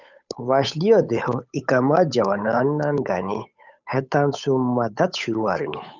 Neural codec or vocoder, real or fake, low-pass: codec, 16 kHz, 8 kbps, FunCodec, trained on Chinese and English, 25 frames a second; fake; 7.2 kHz